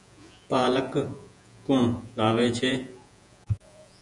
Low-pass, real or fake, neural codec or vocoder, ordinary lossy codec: 10.8 kHz; fake; vocoder, 48 kHz, 128 mel bands, Vocos; MP3, 96 kbps